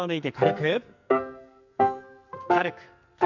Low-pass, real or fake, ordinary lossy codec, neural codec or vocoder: 7.2 kHz; fake; none; codec, 44.1 kHz, 2.6 kbps, SNAC